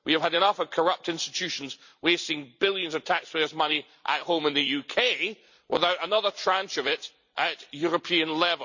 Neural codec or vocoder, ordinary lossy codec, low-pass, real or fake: vocoder, 44.1 kHz, 128 mel bands every 256 samples, BigVGAN v2; none; 7.2 kHz; fake